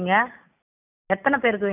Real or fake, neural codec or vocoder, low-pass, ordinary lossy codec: real; none; 3.6 kHz; none